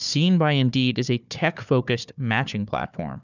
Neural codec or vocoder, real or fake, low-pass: codec, 16 kHz, 4 kbps, FunCodec, trained on Chinese and English, 50 frames a second; fake; 7.2 kHz